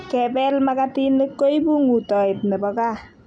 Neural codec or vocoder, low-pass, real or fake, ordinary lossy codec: none; 9.9 kHz; real; none